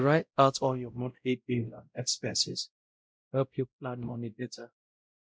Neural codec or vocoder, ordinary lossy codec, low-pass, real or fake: codec, 16 kHz, 0.5 kbps, X-Codec, WavLM features, trained on Multilingual LibriSpeech; none; none; fake